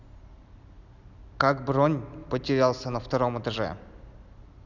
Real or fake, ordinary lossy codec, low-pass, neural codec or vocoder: real; none; 7.2 kHz; none